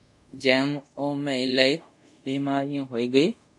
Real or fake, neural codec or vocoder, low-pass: fake; codec, 24 kHz, 0.5 kbps, DualCodec; 10.8 kHz